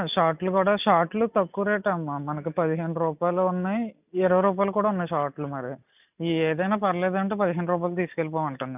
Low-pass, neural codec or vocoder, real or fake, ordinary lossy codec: 3.6 kHz; none; real; none